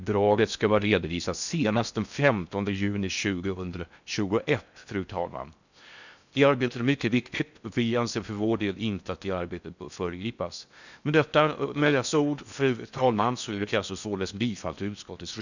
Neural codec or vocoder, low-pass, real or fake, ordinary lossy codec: codec, 16 kHz in and 24 kHz out, 0.6 kbps, FocalCodec, streaming, 4096 codes; 7.2 kHz; fake; none